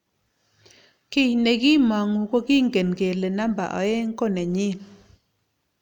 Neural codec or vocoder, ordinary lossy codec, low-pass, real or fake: none; none; 19.8 kHz; real